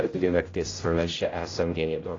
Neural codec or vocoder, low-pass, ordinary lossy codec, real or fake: codec, 16 kHz, 0.5 kbps, X-Codec, HuBERT features, trained on general audio; 7.2 kHz; AAC, 32 kbps; fake